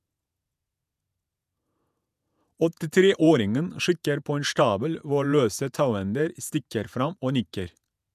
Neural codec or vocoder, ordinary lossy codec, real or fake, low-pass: vocoder, 48 kHz, 128 mel bands, Vocos; none; fake; 14.4 kHz